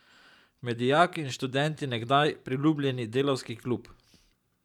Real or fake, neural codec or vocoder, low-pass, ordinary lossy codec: fake; vocoder, 44.1 kHz, 128 mel bands every 512 samples, BigVGAN v2; 19.8 kHz; none